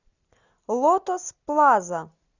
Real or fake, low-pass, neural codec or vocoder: real; 7.2 kHz; none